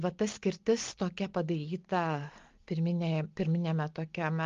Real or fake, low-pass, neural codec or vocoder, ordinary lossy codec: real; 7.2 kHz; none; Opus, 32 kbps